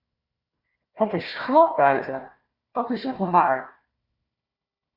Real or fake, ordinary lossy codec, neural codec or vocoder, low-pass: fake; Opus, 64 kbps; codec, 16 kHz, 1 kbps, FunCodec, trained on Chinese and English, 50 frames a second; 5.4 kHz